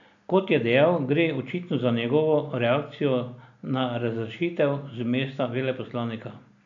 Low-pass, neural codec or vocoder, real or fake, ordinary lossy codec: 7.2 kHz; none; real; none